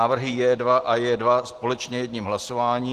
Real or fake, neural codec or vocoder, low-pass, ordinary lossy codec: real; none; 14.4 kHz; Opus, 16 kbps